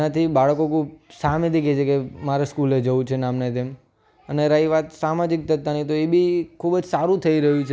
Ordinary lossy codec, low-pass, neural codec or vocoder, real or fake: none; none; none; real